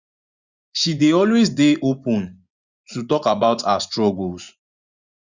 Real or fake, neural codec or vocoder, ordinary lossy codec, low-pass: fake; vocoder, 24 kHz, 100 mel bands, Vocos; Opus, 64 kbps; 7.2 kHz